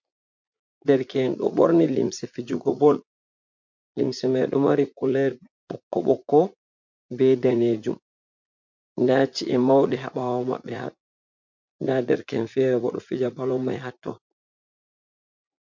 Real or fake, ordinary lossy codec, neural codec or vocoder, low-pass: fake; MP3, 48 kbps; vocoder, 44.1 kHz, 80 mel bands, Vocos; 7.2 kHz